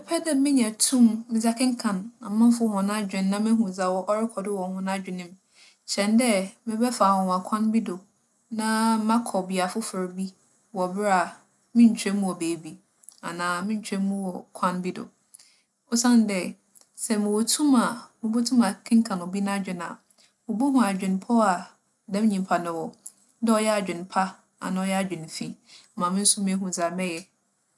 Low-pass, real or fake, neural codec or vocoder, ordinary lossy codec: none; real; none; none